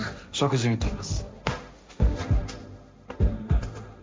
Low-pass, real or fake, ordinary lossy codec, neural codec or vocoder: none; fake; none; codec, 16 kHz, 1.1 kbps, Voila-Tokenizer